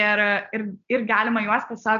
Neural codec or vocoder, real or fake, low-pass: none; real; 7.2 kHz